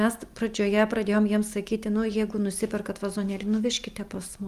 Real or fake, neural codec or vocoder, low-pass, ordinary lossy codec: real; none; 14.4 kHz; Opus, 32 kbps